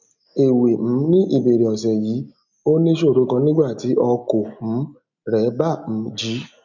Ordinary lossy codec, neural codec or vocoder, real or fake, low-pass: none; none; real; 7.2 kHz